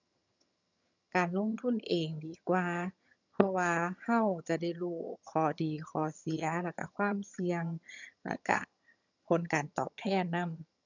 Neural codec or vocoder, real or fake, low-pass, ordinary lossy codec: vocoder, 22.05 kHz, 80 mel bands, HiFi-GAN; fake; 7.2 kHz; none